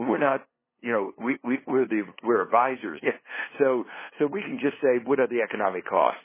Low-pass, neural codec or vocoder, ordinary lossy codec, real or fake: 3.6 kHz; codec, 16 kHz, 2 kbps, FunCodec, trained on LibriTTS, 25 frames a second; MP3, 16 kbps; fake